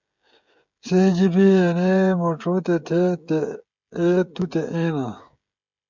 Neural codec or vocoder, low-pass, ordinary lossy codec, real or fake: codec, 16 kHz, 16 kbps, FreqCodec, smaller model; 7.2 kHz; MP3, 64 kbps; fake